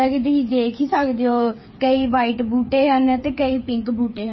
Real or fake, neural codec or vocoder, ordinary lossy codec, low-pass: fake; codec, 16 kHz, 8 kbps, FreqCodec, smaller model; MP3, 24 kbps; 7.2 kHz